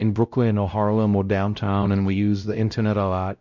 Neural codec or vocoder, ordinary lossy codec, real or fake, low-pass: codec, 16 kHz, 0.5 kbps, X-Codec, WavLM features, trained on Multilingual LibriSpeech; MP3, 64 kbps; fake; 7.2 kHz